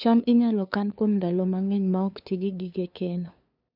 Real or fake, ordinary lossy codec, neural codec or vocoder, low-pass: fake; none; codec, 16 kHz, 2 kbps, FunCodec, trained on LibriTTS, 25 frames a second; 5.4 kHz